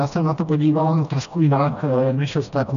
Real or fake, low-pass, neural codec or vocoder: fake; 7.2 kHz; codec, 16 kHz, 1 kbps, FreqCodec, smaller model